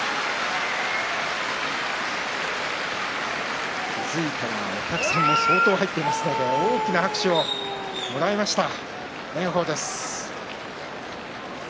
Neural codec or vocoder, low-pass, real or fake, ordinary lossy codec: none; none; real; none